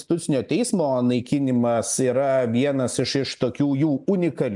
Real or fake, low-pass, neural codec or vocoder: real; 10.8 kHz; none